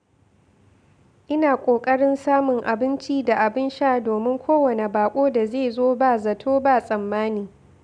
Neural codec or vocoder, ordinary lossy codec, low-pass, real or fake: none; none; 9.9 kHz; real